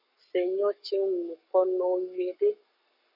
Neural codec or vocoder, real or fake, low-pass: codec, 44.1 kHz, 7.8 kbps, Pupu-Codec; fake; 5.4 kHz